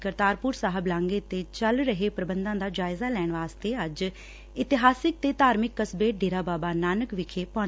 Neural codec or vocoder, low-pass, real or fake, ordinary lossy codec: none; none; real; none